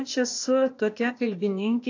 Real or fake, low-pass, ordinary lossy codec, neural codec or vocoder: fake; 7.2 kHz; AAC, 48 kbps; codec, 16 kHz, 0.8 kbps, ZipCodec